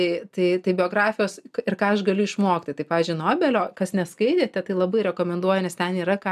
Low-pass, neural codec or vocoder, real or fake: 14.4 kHz; none; real